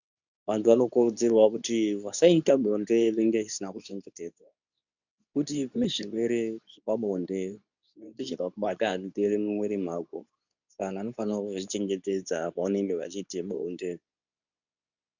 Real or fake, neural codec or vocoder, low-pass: fake; codec, 24 kHz, 0.9 kbps, WavTokenizer, medium speech release version 2; 7.2 kHz